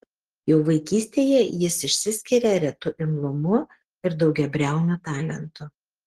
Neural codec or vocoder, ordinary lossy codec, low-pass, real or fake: none; Opus, 16 kbps; 14.4 kHz; real